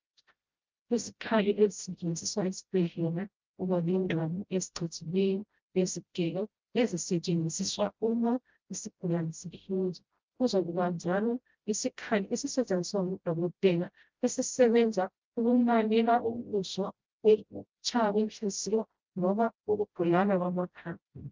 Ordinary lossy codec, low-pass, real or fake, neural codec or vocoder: Opus, 24 kbps; 7.2 kHz; fake; codec, 16 kHz, 0.5 kbps, FreqCodec, smaller model